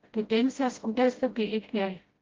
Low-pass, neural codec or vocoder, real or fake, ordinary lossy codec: 7.2 kHz; codec, 16 kHz, 0.5 kbps, FreqCodec, smaller model; fake; Opus, 32 kbps